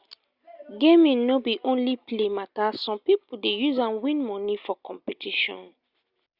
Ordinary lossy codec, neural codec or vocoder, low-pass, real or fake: Opus, 64 kbps; none; 5.4 kHz; real